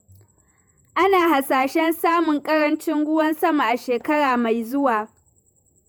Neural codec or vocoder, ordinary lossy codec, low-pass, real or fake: vocoder, 48 kHz, 128 mel bands, Vocos; none; none; fake